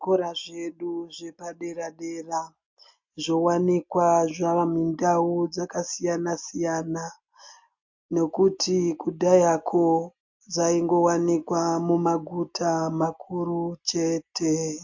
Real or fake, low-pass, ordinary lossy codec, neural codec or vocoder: real; 7.2 kHz; MP3, 48 kbps; none